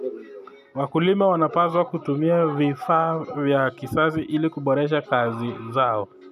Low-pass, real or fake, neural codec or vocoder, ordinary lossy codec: 14.4 kHz; real; none; none